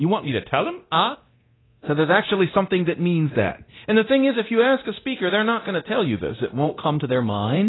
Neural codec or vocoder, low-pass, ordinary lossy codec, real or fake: codec, 24 kHz, 0.9 kbps, DualCodec; 7.2 kHz; AAC, 16 kbps; fake